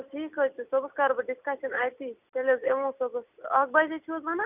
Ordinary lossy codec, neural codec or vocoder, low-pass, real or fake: none; none; 3.6 kHz; real